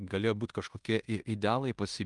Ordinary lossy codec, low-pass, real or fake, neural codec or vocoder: Opus, 32 kbps; 10.8 kHz; fake; codec, 16 kHz in and 24 kHz out, 0.9 kbps, LongCat-Audio-Codec, fine tuned four codebook decoder